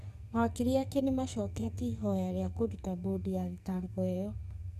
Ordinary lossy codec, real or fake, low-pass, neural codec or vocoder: none; fake; 14.4 kHz; codec, 44.1 kHz, 2.6 kbps, SNAC